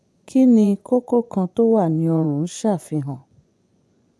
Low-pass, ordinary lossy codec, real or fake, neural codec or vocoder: none; none; fake; vocoder, 24 kHz, 100 mel bands, Vocos